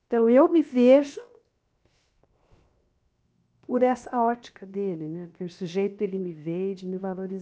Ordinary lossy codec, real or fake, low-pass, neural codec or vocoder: none; fake; none; codec, 16 kHz, 0.7 kbps, FocalCodec